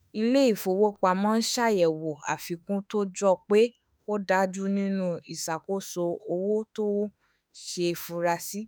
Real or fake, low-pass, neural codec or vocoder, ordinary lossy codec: fake; none; autoencoder, 48 kHz, 32 numbers a frame, DAC-VAE, trained on Japanese speech; none